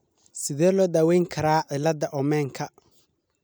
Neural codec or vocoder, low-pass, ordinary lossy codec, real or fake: none; none; none; real